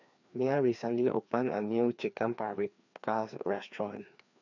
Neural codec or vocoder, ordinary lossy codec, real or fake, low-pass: codec, 16 kHz, 2 kbps, FreqCodec, larger model; none; fake; 7.2 kHz